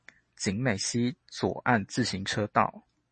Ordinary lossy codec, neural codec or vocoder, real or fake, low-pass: MP3, 32 kbps; none; real; 10.8 kHz